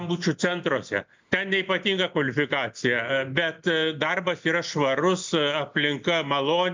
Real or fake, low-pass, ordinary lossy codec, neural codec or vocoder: real; 7.2 kHz; MP3, 64 kbps; none